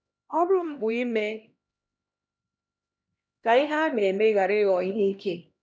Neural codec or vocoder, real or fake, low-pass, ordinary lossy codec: codec, 16 kHz, 1 kbps, X-Codec, HuBERT features, trained on LibriSpeech; fake; none; none